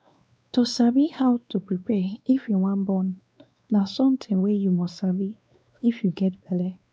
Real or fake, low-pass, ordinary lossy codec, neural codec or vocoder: fake; none; none; codec, 16 kHz, 2 kbps, X-Codec, WavLM features, trained on Multilingual LibriSpeech